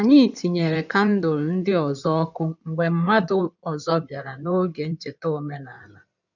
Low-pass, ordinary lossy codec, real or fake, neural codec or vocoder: 7.2 kHz; none; fake; codec, 16 kHz in and 24 kHz out, 2.2 kbps, FireRedTTS-2 codec